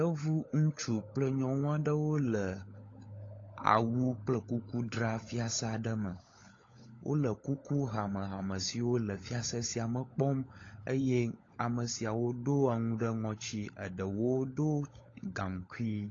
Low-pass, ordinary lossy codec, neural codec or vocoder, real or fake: 7.2 kHz; AAC, 32 kbps; codec, 16 kHz, 16 kbps, FunCodec, trained on LibriTTS, 50 frames a second; fake